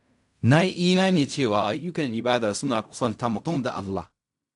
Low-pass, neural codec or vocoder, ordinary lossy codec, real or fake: 10.8 kHz; codec, 16 kHz in and 24 kHz out, 0.4 kbps, LongCat-Audio-Codec, fine tuned four codebook decoder; none; fake